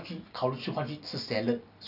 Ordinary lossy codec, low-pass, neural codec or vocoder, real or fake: none; 5.4 kHz; none; real